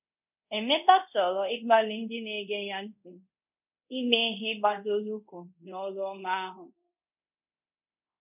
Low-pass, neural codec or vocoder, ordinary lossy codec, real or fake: 3.6 kHz; codec, 24 kHz, 0.5 kbps, DualCodec; none; fake